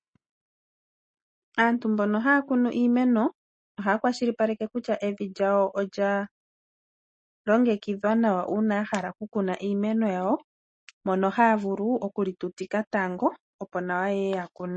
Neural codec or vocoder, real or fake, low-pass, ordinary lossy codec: none; real; 9.9 kHz; MP3, 32 kbps